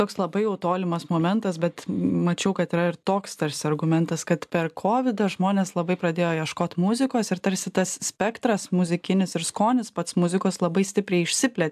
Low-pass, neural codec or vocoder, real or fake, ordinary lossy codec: 14.4 kHz; none; real; AAC, 96 kbps